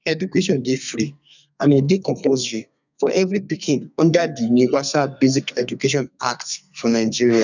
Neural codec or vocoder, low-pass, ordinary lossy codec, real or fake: codec, 32 kHz, 1.9 kbps, SNAC; 7.2 kHz; none; fake